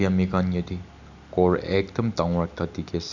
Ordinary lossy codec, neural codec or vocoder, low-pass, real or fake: none; none; 7.2 kHz; real